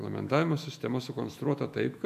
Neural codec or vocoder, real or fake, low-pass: autoencoder, 48 kHz, 128 numbers a frame, DAC-VAE, trained on Japanese speech; fake; 14.4 kHz